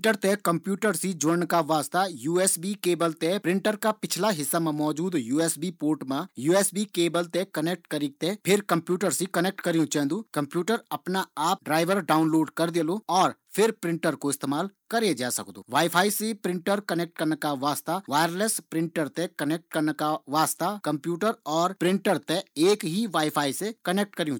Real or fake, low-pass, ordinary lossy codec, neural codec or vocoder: real; none; none; none